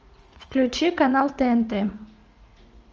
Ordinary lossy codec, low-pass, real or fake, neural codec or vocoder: Opus, 24 kbps; 7.2 kHz; fake; vocoder, 44.1 kHz, 80 mel bands, Vocos